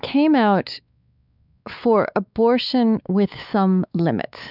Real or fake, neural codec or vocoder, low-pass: fake; codec, 16 kHz, 4 kbps, X-Codec, WavLM features, trained on Multilingual LibriSpeech; 5.4 kHz